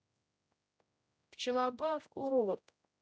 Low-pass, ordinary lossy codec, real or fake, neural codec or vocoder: none; none; fake; codec, 16 kHz, 0.5 kbps, X-Codec, HuBERT features, trained on general audio